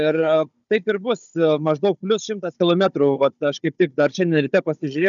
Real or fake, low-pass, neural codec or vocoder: fake; 7.2 kHz; codec, 16 kHz, 16 kbps, FunCodec, trained on Chinese and English, 50 frames a second